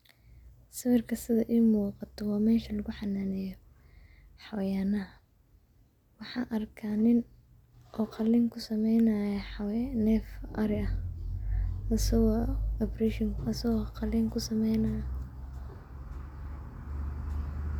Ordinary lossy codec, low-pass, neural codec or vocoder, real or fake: none; 19.8 kHz; none; real